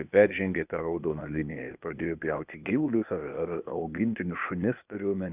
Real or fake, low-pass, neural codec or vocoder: fake; 3.6 kHz; codec, 16 kHz, 0.8 kbps, ZipCodec